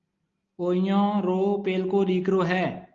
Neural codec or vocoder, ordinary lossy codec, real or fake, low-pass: none; Opus, 32 kbps; real; 7.2 kHz